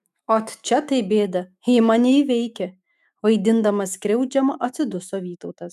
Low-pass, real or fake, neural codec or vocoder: 14.4 kHz; fake; autoencoder, 48 kHz, 128 numbers a frame, DAC-VAE, trained on Japanese speech